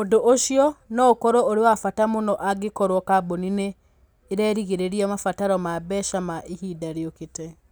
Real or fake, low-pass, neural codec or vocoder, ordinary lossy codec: real; none; none; none